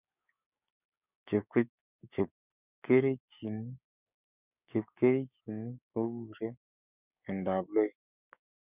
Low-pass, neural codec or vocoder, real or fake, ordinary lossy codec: 3.6 kHz; codec, 16 kHz, 6 kbps, DAC; fake; none